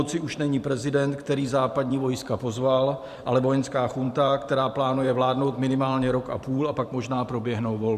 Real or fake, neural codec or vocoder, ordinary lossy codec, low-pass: fake; vocoder, 44.1 kHz, 128 mel bands every 512 samples, BigVGAN v2; AAC, 96 kbps; 14.4 kHz